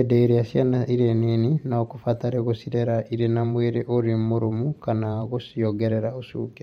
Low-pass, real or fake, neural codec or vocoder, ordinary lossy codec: 19.8 kHz; fake; vocoder, 44.1 kHz, 128 mel bands every 512 samples, BigVGAN v2; MP3, 64 kbps